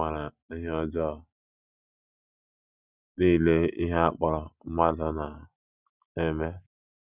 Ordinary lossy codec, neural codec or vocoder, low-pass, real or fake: none; none; 3.6 kHz; real